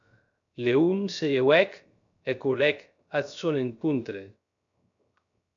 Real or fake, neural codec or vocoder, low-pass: fake; codec, 16 kHz, 0.3 kbps, FocalCodec; 7.2 kHz